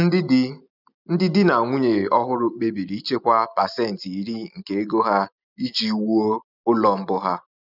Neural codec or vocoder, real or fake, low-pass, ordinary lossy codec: none; real; 5.4 kHz; none